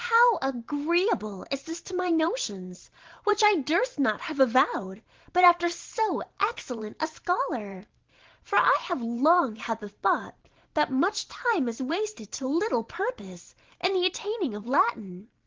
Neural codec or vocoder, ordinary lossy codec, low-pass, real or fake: vocoder, 44.1 kHz, 128 mel bands every 512 samples, BigVGAN v2; Opus, 16 kbps; 7.2 kHz; fake